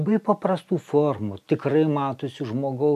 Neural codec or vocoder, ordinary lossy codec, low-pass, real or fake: none; MP3, 96 kbps; 14.4 kHz; real